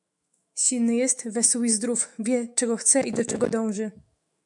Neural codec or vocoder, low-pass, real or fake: autoencoder, 48 kHz, 128 numbers a frame, DAC-VAE, trained on Japanese speech; 10.8 kHz; fake